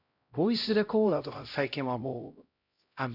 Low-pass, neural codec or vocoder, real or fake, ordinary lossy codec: 5.4 kHz; codec, 16 kHz, 0.5 kbps, X-Codec, HuBERT features, trained on LibriSpeech; fake; none